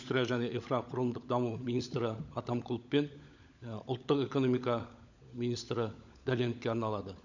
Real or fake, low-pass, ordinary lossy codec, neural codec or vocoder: fake; 7.2 kHz; none; codec, 16 kHz, 16 kbps, FunCodec, trained on LibriTTS, 50 frames a second